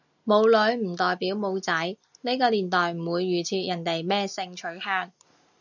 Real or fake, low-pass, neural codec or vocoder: real; 7.2 kHz; none